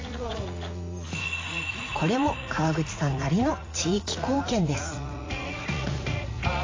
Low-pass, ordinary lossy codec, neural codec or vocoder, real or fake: 7.2 kHz; AAC, 32 kbps; vocoder, 44.1 kHz, 80 mel bands, Vocos; fake